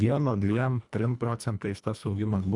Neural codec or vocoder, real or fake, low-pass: codec, 24 kHz, 1.5 kbps, HILCodec; fake; 10.8 kHz